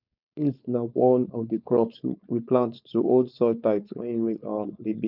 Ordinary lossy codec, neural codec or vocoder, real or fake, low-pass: none; codec, 16 kHz, 4.8 kbps, FACodec; fake; 5.4 kHz